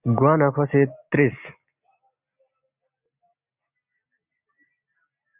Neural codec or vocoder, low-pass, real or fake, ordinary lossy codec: none; 3.6 kHz; real; Opus, 64 kbps